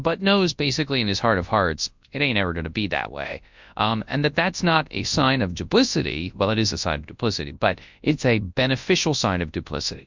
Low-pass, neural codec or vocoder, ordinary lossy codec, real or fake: 7.2 kHz; codec, 24 kHz, 0.9 kbps, WavTokenizer, large speech release; MP3, 64 kbps; fake